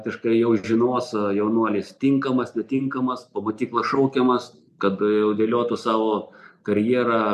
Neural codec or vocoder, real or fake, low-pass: none; real; 14.4 kHz